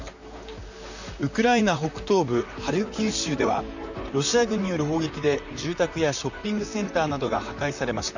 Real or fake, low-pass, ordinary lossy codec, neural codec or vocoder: fake; 7.2 kHz; none; vocoder, 44.1 kHz, 128 mel bands, Pupu-Vocoder